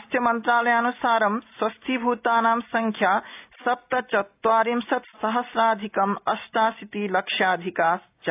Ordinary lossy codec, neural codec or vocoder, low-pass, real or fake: AAC, 32 kbps; none; 3.6 kHz; real